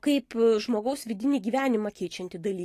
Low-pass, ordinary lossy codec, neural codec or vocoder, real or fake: 14.4 kHz; AAC, 48 kbps; none; real